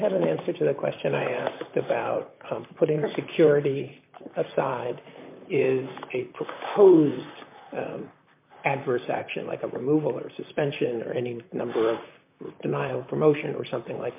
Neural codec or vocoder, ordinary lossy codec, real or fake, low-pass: vocoder, 44.1 kHz, 128 mel bands, Pupu-Vocoder; MP3, 24 kbps; fake; 3.6 kHz